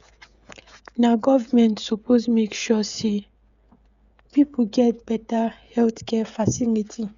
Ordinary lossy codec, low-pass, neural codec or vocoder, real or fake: Opus, 64 kbps; 7.2 kHz; codec, 16 kHz, 16 kbps, FreqCodec, smaller model; fake